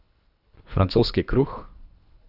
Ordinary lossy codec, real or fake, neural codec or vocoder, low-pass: none; fake; codec, 24 kHz, 3 kbps, HILCodec; 5.4 kHz